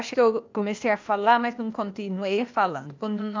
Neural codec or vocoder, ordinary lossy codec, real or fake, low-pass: codec, 16 kHz, 0.8 kbps, ZipCodec; MP3, 64 kbps; fake; 7.2 kHz